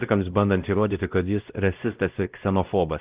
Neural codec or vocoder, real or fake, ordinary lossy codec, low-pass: codec, 16 kHz, 0.5 kbps, X-Codec, WavLM features, trained on Multilingual LibriSpeech; fake; Opus, 32 kbps; 3.6 kHz